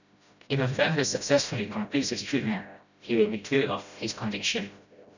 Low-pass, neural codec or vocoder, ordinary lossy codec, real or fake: 7.2 kHz; codec, 16 kHz, 0.5 kbps, FreqCodec, smaller model; none; fake